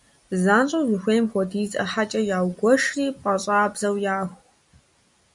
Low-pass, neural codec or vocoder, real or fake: 10.8 kHz; none; real